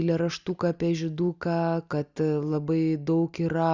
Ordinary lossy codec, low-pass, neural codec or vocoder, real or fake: Opus, 64 kbps; 7.2 kHz; none; real